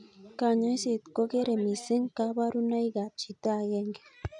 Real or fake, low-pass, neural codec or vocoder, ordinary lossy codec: real; 10.8 kHz; none; none